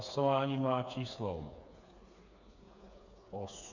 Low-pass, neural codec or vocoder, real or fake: 7.2 kHz; codec, 16 kHz, 4 kbps, FreqCodec, smaller model; fake